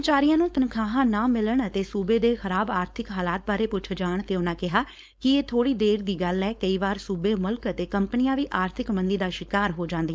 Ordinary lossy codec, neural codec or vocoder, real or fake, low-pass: none; codec, 16 kHz, 4.8 kbps, FACodec; fake; none